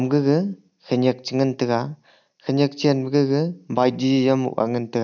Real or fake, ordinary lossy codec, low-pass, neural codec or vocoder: real; none; 7.2 kHz; none